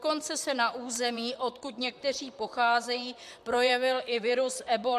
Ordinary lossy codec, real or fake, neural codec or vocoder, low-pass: MP3, 96 kbps; fake; vocoder, 44.1 kHz, 128 mel bands, Pupu-Vocoder; 14.4 kHz